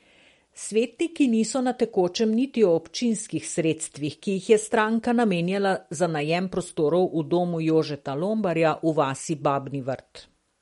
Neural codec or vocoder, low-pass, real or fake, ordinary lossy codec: none; 19.8 kHz; real; MP3, 48 kbps